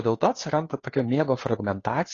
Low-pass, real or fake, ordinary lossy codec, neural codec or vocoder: 7.2 kHz; fake; AAC, 32 kbps; codec, 16 kHz, 2 kbps, FunCodec, trained on Chinese and English, 25 frames a second